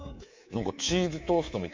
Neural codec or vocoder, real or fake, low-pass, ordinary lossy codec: codec, 24 kHz, 3.1 kbps, DualCodec; fake; 7.2 kHz; MP3, 48 kbps